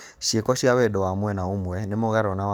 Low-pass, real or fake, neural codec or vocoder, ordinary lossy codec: none; fake; codec, 44.1 kHz, 7.8 kbps, DAC; none